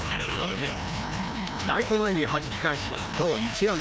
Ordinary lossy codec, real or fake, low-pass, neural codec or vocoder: none; fake; none; codec, 16 kHz, 1 kbps, FreqCodec, larger model